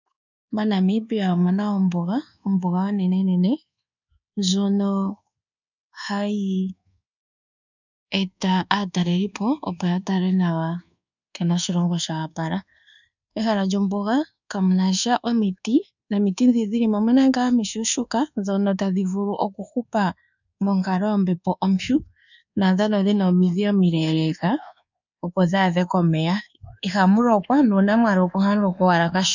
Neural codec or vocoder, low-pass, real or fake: codec, 24 kHz, 1.2 kbps, DualCodec; 7.2 kHz; fake